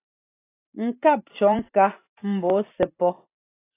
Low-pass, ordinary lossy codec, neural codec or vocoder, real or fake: 3.6 kHz; AAC, 24 kbps; vocoder, 44.1 kHz, 128 mel bands every 256 samples, BigVGAN v2; fake